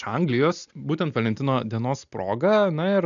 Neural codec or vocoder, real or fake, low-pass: none; real; 7.2 kHz